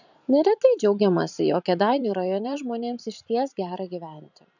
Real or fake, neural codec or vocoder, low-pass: fake; vocoder, 44.1 kHz, 128 mel bands every 512 samples, BigVGAN v2; 7.2 kHz